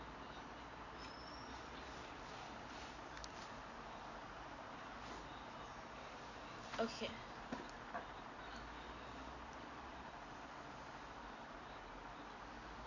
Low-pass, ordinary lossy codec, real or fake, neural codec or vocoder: 7.2 kHz; none; real; none